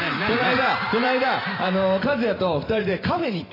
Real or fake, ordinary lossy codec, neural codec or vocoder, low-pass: fake; AAC, 24 kbps; vocoder, 44.1 kHz, 128 mel bands every 512 samples, BigVGAN v2; 5.4 kHz